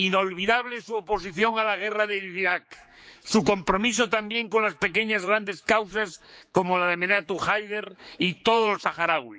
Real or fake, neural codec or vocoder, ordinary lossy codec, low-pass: fake; codec, 16 kHz, 4 kbps, X-Codec, HuBERT features, trained on general audio; none; none